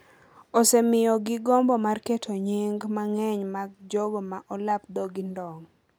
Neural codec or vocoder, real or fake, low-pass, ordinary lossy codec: none; real; none; none